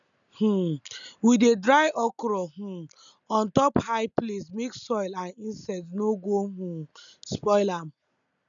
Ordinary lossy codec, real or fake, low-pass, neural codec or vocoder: none; real; 7.2 kHz; none